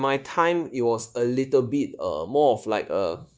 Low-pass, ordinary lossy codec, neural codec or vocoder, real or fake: none; none; codec, 16 kHz, 0.9 kbps, LongCat-Audio-Codec; fake